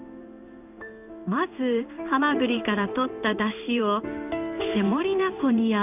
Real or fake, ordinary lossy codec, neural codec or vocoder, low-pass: fake; none; codec, 16 kHz in and 24 kHz out, 1 kbps, XY-Tokenizer; 3.6 kHz